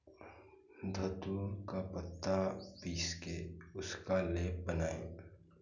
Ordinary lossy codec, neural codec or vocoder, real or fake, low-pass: none; none; real; 7.2 kHz